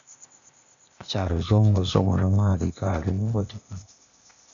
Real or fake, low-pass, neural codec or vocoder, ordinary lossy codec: fake; 7.2 kHz; codec, 16 kHz, 0.8 kbps, ZipCodec; MP3, 96 kbps